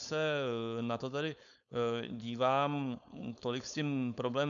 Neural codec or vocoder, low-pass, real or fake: codec, 16 kHz, 4.8 kbps, FACodec; 7.2 kHz; fake